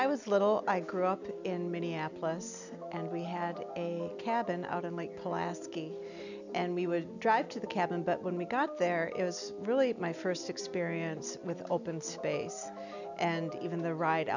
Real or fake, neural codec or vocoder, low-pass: real; none; 7.2 kHz